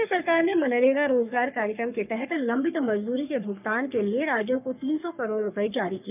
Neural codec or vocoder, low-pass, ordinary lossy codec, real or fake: codec, 44.1 kHz, 3.4 kbps, Pupu-Codec; 3.6 kHz; none; fake